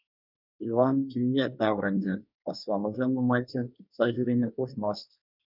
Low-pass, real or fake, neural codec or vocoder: 5.4 kHz; fake; codec, 24 kHz, 1 kbps, SNAC